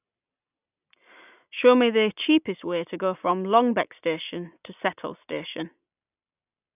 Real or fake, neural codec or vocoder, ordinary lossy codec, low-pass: real; none; none; 3.6 kHz